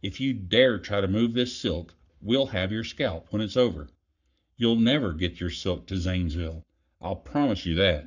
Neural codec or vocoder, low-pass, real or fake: codec, 44.1 kHz, 7.8 kbps, Pupu-Codec; 7.2 kHz; fake